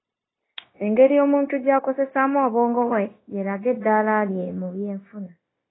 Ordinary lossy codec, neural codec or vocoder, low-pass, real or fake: AAC, 16 kbps; codec, 16 kHz, 0.9 kbps, LongCat-Audio-Codec; 7.2 kHz; fake